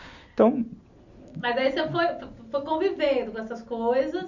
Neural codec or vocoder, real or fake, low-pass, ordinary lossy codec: none; real; 7.2 kHz; none